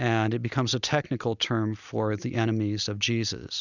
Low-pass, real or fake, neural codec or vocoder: 7.2 kHz; real; none